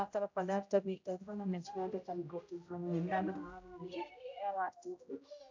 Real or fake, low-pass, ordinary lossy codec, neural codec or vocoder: fake; 7.2 kHz; AAC, 48 kbps; codec, 16 kHz, 0.5 kbps, X-Codec, HuBERT features, trained on general audio